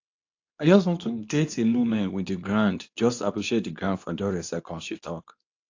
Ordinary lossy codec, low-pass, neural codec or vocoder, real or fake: AAC, 48 kbps; 7.2 kHz; codec, 24 kHz, 0.9 kbps, WavTokenizer, medium speech release version 2; fake